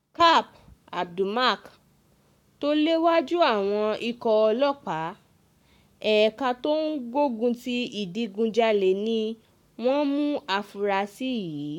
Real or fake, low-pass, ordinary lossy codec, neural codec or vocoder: fake; 19.8 kHz; none; codec, 44.1 kHz, 7.8 kbps, Pupu-Codec